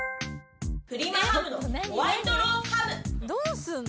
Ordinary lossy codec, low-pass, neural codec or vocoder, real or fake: none; none; none; real